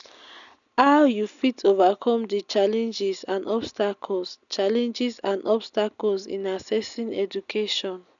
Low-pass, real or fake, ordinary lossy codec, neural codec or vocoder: 7.2 kHz; real; none; none